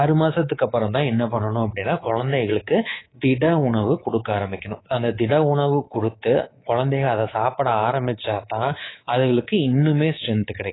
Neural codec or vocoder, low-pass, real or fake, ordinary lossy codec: codec, 24 kHz, 3.1 kbps, DualCodec; 7.2 kHz; fake; AAC, 16 kbps